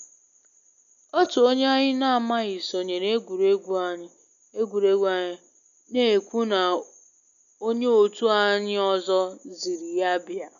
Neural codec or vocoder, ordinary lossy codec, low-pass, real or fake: none; AAC, 96 kbps; 7.2 kHz; real